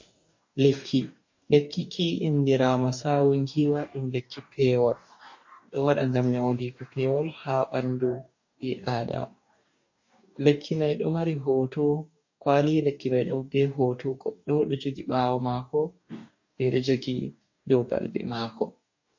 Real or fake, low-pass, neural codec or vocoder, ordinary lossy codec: fake; 7.2 kHz; codec, 44.1 kHz, 2.6 kbps, DAC; MP3, 48 kbps